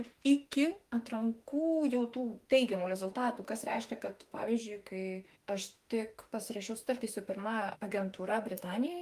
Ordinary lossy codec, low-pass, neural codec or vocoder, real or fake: Opus, 32 kbps; 14.4 kHz; autoencoder, 48 kHz, 32 numbers a frame, DAC-VAE, trained on Japanese speech; fake